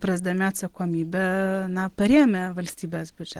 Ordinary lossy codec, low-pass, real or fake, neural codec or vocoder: Opus, 16 kbps; 19.8 kHz; real; none